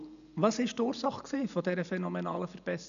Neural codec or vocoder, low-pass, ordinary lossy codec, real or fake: none; 7.2 kHz; none; real